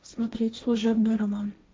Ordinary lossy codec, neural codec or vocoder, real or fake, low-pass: none; codec, 16 kHz, 1.1 kbps, Voila-Tokenizer; fake; none